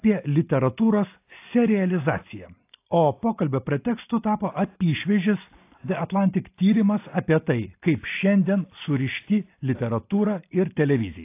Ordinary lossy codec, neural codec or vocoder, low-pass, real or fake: AAC, 24 kbps; none; 3.6 kHz; real